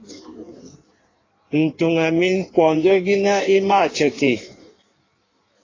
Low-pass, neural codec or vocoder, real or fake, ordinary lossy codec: 7.2 kHz; codec, 16 kHz in and 24 kHz out, 1.1 kbps, FireRedTTS-2 codec; fake; AAC, 32 kbps